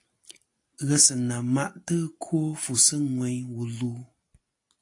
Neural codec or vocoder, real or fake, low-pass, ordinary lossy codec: none; real; 10.8 kHz; AAC, 48 kbps